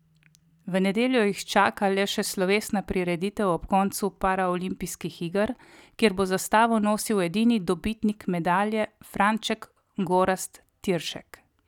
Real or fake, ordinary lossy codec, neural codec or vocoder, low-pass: real; none; none; 19.8 kHz